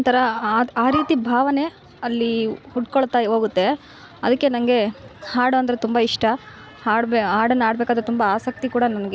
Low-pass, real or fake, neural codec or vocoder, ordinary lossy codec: none; real; none; none